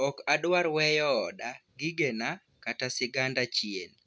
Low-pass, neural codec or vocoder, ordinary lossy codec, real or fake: none; none; none; real